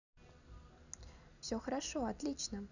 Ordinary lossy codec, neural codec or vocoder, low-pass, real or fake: none; none; 7.2 kHz; real